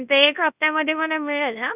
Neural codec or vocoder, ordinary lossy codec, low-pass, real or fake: codec, 24 kHz, 0.9 kbps, WavTokenizer, large speech release; none; 3.6 kHz; fake